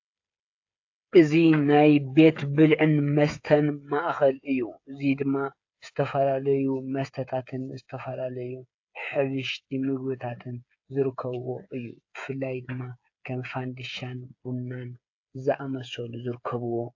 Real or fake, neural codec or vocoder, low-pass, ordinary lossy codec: fake; codec, 16 kHz, 8 kbps, FreqCodec, smaller model; 7.2 kHz; AAC, 48 kbps